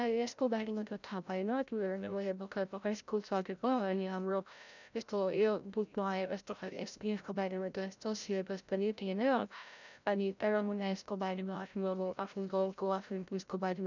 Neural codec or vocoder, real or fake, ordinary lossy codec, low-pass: codec, 16 kHz, 0.5 kbps, FreqCodec, larger model; fake; none; 7.2 kHz